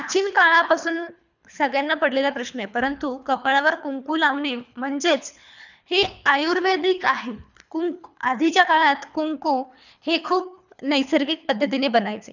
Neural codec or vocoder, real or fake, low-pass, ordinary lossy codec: codec, 24 kHz, 3 kbps, HILCodec; fake; 7.2 kHz; none